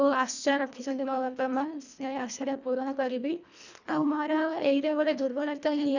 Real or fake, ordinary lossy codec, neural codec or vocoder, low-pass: fake; none; codec, 24 kHz, 1.5 kbps, HILCodec; 7.2 kHz